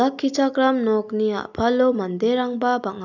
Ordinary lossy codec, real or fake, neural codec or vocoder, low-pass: none; real; none; 7.2 kHz